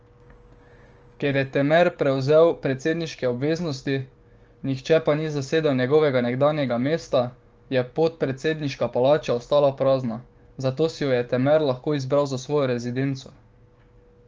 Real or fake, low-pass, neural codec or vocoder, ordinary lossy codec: fake; 7.2 kHz; codec, 16 kHz, 6 kbps, DAC; Opus, 24 kbps